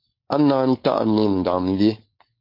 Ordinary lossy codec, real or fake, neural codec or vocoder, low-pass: MP3, 32 kbps; fake; codec, 16 kHz, 4.8 kbps, FACodec; 5.4 kHz